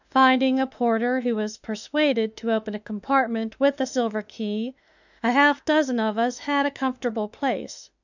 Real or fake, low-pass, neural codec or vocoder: fake; 7.2 kHz; autoencoder, 48 kHz, 32 numbers a frame, DAC-VAE, trained on Japanese speech